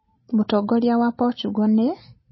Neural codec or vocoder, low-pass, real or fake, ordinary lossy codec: none; 7.2 kHz; real; MP3, 24 kbps